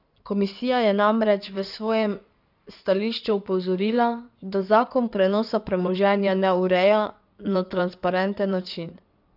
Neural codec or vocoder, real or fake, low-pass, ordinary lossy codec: codec, 16 kHz in and 24 kHz out, 2.2 kbps, FireRedTTS-2 codec; fake; 5.4 kHz; none